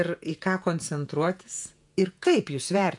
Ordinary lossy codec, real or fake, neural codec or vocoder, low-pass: MP3, 64 kbps; fake; vocoder, 48 kHz, 128 mel bands, Vocos; 10.8 kHz